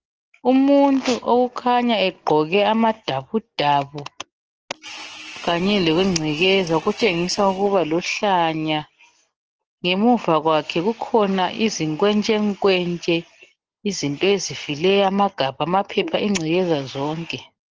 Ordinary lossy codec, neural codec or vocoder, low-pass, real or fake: Opus, 16 kbps; none; 7.2 kHz; real